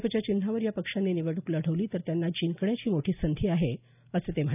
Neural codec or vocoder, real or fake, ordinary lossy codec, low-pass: none; real; none; 3.6 kHz